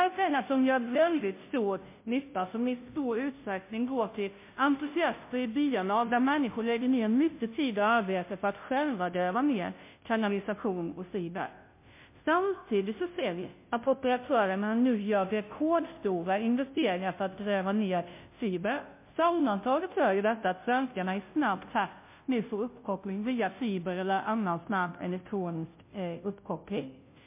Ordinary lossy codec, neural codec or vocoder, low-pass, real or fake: MP3, 24 kbps; codec, 16 kHz, 0.5 kbps, FunCodec, trained on Chinese and English, 25 frames a second; 3.6 kHz; fake